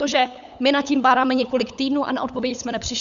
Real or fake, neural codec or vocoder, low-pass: fake; codec, 16 kHz, 8 kbps, FunCodec, trained on LibriTTS, 25 frames a second; 7.2 kHz